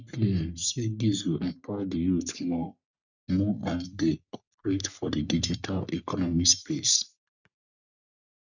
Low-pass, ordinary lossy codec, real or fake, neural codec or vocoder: 7.2 kHz; none; fake; codec, 44.1 kHz, 3.4 kbps, Pupu-Codec